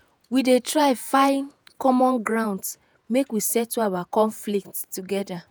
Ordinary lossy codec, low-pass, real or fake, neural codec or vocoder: none; none; fake; vocoder, 48 kHz, 128 mel bands, Vocos